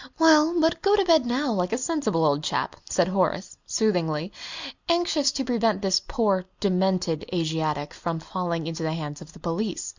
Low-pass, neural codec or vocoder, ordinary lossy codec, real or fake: 7.2 kHz; none; Opus, 64 kbps; real